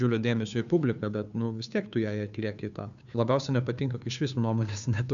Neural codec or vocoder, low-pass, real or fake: codec, 16 kHz, 2 kbps, FunCodec, trained on Chinese and English, 25 frames a second; 7.2 kHz; fake